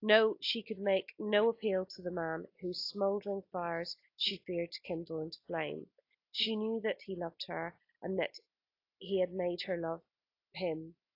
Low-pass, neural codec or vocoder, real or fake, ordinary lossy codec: 5.4 kHz; none; real; AAC, 32 kbps